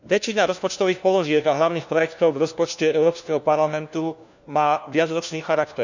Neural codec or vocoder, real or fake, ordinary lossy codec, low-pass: codec, 16 kHz, 1 kbps, FunCodec, trained on LibriTTS, 50 frames a second; fake; none; 7.2 kHz